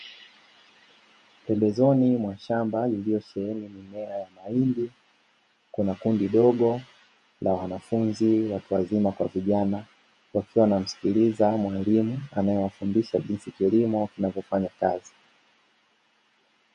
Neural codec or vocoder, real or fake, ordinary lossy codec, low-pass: vocoder, 48 kHz, 128 mel bands, Vocos; fake; MP3, 48 kbps; 14.4 kHz